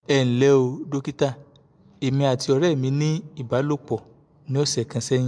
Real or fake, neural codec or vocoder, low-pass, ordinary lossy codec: real; none; 9.9 kHz; MP3, 64 kbps